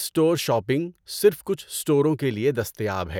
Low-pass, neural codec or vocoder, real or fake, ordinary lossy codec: none; none; real; none